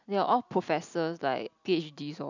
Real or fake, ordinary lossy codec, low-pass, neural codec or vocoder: real; none; 7.2 kHz; none